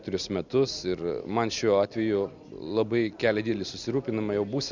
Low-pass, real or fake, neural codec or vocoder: 7.2 kHz; real; none